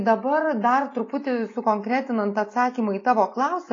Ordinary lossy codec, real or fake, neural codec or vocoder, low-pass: AAC, 32 kbps; real; none; 7.2 kHz